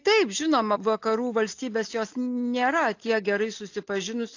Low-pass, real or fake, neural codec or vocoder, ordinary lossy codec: 7.2 kHz; real; none; AAC, 48 kbps